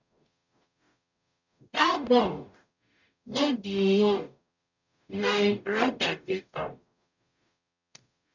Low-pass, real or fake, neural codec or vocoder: 7.2 kHz; fake; codec, 44.1 kHz, 0.9 kbps, DAC